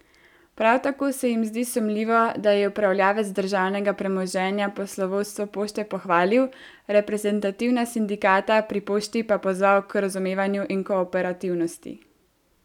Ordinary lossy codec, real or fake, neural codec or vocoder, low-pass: none; real; none; 19.8 kHz